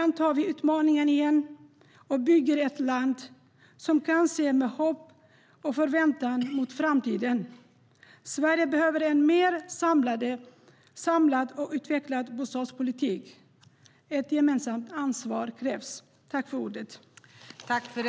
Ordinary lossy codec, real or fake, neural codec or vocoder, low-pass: none; real; none; none